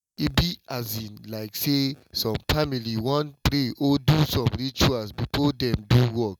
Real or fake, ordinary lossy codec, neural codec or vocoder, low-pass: real; none; none; 19.8 kHz